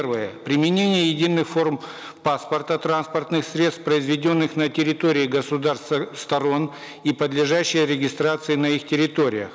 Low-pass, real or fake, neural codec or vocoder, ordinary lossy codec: none; real; none; none